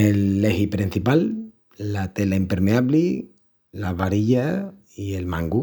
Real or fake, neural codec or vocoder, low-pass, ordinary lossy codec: real; none; none; none